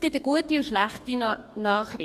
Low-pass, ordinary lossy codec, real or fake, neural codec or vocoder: 14.4 kHz; Opus, 64 kbps; fake; codec, 44.1 kHz, 2.6 kbps, DAC